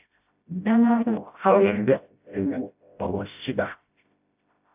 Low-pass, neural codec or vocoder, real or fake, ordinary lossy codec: 3.6 kHz; codec, 16 kHz, 0.5 kbps, FreqCodec, smaller model; fake; AAC, 32 kbps